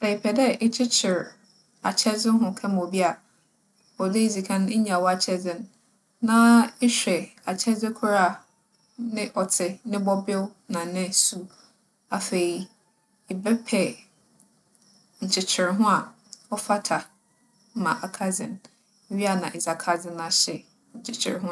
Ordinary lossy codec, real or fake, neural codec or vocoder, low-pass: none; real; none; none